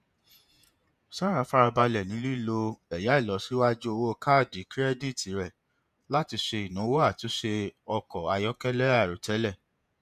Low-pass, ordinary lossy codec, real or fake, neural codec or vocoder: 14.4 kHz; none; fake; vocoder, 48 kHz, 128 mel bands, Vocos